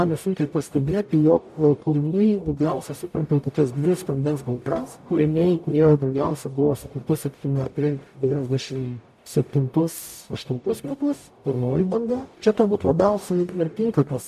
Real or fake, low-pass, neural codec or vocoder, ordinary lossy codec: fake; 14.4 kHz; codec, 44.1 kHz, 0.9 kbps, DAC; AAC, 96 kbps